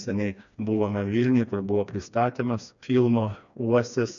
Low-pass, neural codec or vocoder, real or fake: 7.2 kHz; codec, 16 kHz, 2 kbps, FreqCodec, smaller model; fake